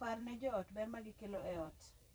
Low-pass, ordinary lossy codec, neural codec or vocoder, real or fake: none; none; vocoder, 44.1 kHz, 128 mel bands every 512 samples, BigVGAN v2; fake